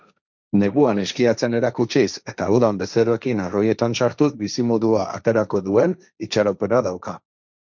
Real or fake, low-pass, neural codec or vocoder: fake; 7.2 kHz; codec, 16 kHz, 1.1 kbps, Voila-Tokenizer